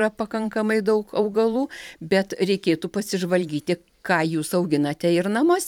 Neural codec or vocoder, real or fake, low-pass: vocoder, 44.1 kHz, 128 mel bands every 512 samples, BigVGAN v2; fake; 19.8 kHz